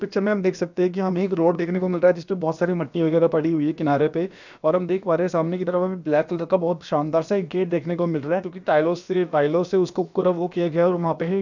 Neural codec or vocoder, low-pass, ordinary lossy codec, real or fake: codec, 16 kHz, about 1 kbps, DyCAST, with the encoder's durations; 7.2 kHz; none; fake